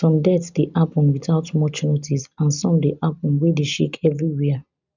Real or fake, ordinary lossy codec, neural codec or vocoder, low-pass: real; none; none; 7.2 kHz